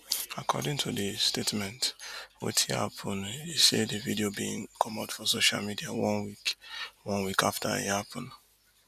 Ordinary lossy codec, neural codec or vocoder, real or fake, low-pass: none; none; real; 14.4 kHz